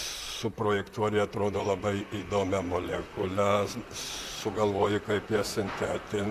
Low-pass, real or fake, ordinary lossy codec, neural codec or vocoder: 14.4 kHz; fake; AAC, 64 kbps; vocoder, 44.1 kHz, 128 mel bands, Pupu-Vocoder